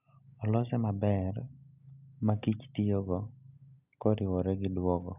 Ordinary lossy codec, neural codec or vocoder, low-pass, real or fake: none; none; 3.6 kHz; real